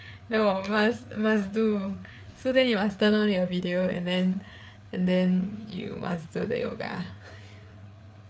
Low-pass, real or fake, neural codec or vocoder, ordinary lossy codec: none; fake; codec, 16 kHz, 4 kbps, FreqCodec, larger model; none